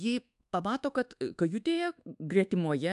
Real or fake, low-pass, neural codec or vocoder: fake; 10.8 kHz; codec, 24 kHz, 1.2 kbps, DualCodec